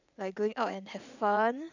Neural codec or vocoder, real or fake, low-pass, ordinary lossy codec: vocoder, 44.1 kHz, 80 mel bands, Vocos; fake; 7.2 kHz; none